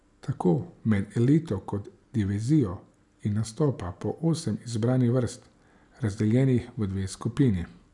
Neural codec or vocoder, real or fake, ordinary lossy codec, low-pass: none; real; none; 10.8 kHz